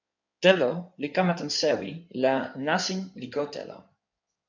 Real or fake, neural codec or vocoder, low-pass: fake; codec, 16 kHz in and 24 kHz out, 2.2 kbps, FireRedTTS-2 codec; 7.2 kHz